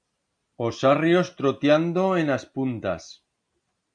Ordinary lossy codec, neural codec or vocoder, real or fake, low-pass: MP3, 96 kbps; none; real; 9.9 kHz